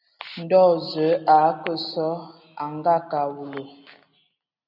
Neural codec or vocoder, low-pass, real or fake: none; 5.4 kHz; real